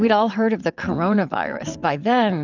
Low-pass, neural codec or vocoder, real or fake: 7.2 kHz; vocoder, 22.05 kHz, 80 mel bands, WaveNeXt; fake